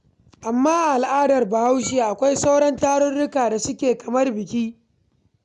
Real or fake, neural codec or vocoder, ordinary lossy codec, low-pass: real; none; none; 10.8 kHz